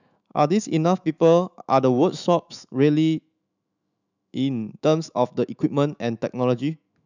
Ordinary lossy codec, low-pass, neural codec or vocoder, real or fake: none; 7.2 kHz; autoencoder, 48 kHz, 128 numbers a frame, DAC-VAE, trained on Japanese speech; fake